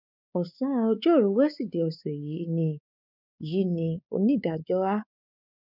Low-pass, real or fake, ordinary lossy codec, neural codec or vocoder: 5.4 kHz; fake; none; codec, 16 kHz, 4 kbps, X-Codec, WavLM features, trained on Multilingual LibriSpeech